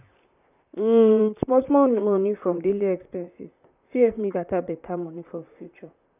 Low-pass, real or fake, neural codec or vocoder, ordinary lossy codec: 3.6 kHz; fake; vocoder, 44.1 kHz, 128 mel bands, Pupu-Vocoder; none